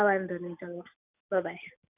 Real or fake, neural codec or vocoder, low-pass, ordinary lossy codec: real; none; 3.6 kHz; none